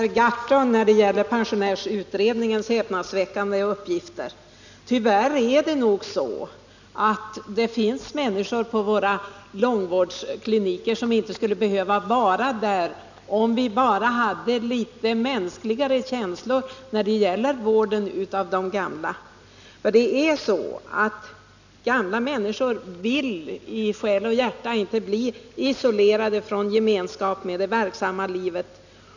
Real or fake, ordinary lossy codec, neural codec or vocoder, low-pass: real; none; none; 7.2 kHz